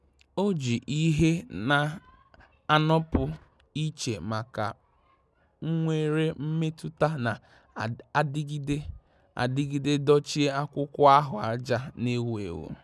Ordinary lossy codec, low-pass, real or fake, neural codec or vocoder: none; none; real; none